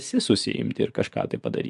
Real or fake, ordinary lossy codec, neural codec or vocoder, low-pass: fake; AAC, 96 kbps; vocoder, 24 kHz, 100 mel bands, Vocos; 10.8 kHz